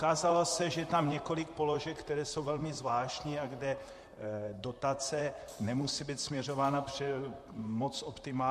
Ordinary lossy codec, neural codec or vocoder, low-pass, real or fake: MP3, 64 kbps; vocoder, 44.1 kHz, 128 mel bands, Pupu-Vocoder; 14.4 kHz; fake